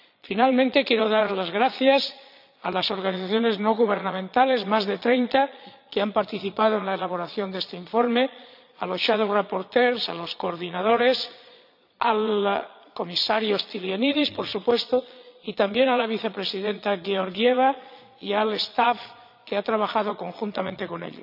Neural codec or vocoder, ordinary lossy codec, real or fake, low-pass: vocoder, 22.05 kHz, 80 mel bands, Vocos; none; fake; 5.4 kHz